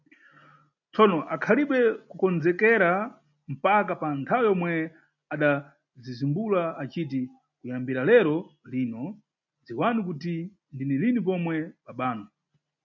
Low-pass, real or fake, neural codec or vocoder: 7.2 kHz; real; none